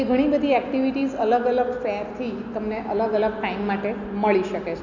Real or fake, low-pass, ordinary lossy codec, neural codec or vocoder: real; 7.2 kHz; none; none